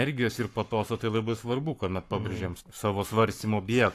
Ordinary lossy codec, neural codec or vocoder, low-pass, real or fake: AAC, 64 kbps; codec, 44.1 kHz, 7.8 kbps, Pupu-Codec; 14.4 kHz; fake